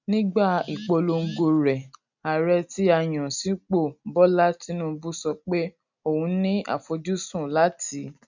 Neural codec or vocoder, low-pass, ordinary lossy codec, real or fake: none; 7.2 kHz; none; real